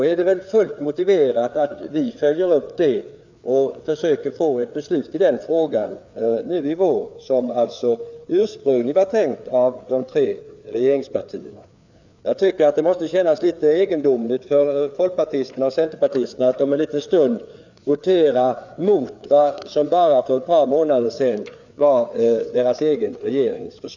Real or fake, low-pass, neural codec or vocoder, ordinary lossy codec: fake; 7.2 kHz; codec, 16 kHz, 4 kbps, FreqCodec, larger model; none